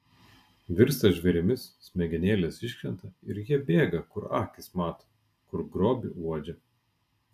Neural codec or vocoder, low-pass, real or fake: none; 14.4 kHz; real